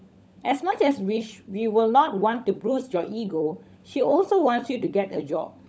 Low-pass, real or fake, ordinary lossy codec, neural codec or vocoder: none; fake; none; codec, 16 kHz, 16 kbps, FunCodec, trained on LibriTTS, 50 frames a second